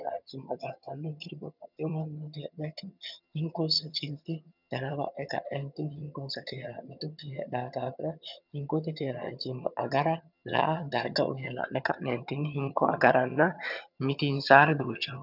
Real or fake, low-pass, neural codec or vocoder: fake; 5.4 kHz; vocoder, 22.05 kHz, 80 mel bands, HiFi-GAN